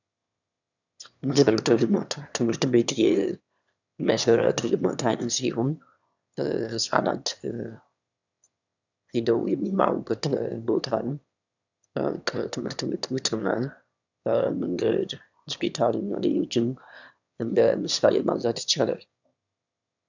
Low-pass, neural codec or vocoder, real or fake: 7.2 kHz; autoencoder, 22.05 kHz, a latent of 192 numbers a frame, VITS, trained on one speaker; fake